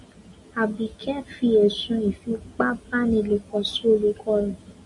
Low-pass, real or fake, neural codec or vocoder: 10.8 kHz; real; none